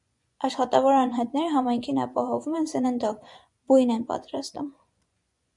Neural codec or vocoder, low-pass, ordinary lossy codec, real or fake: vocoder, 24 kHz, 100 mel bands, Vocos; 10.8 kHz; MP3, 96 kbps; fake